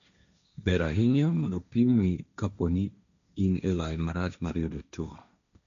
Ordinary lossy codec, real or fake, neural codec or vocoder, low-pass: none; fake; codec, 16 kHz, 1.1 kbps, Voila-Tokenizer; 7.2 kHz